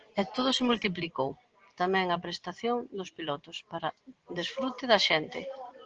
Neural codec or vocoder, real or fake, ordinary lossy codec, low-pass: none; real; Opus, 16 kbps; 7.2 kHz